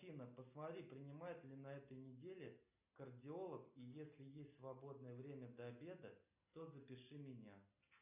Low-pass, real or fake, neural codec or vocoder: 3.6 kHz; real; none